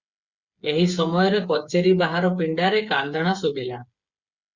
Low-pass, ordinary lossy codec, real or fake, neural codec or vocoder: 7.2 kHz; Opus, 64 kbps; fake; codec, 16 kHz, 8 kbps, FreqCodec, smaller model